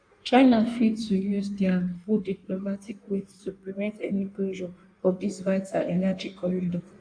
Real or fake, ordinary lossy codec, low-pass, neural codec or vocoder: fake; AAC, 64 kbps; 9.9 kHz; codec, 16 kHz in and 24 kHz out, 1.1 kbps, FireRedTTS-2 codec